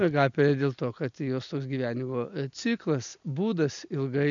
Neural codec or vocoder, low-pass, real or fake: none; 7.2 kHz; real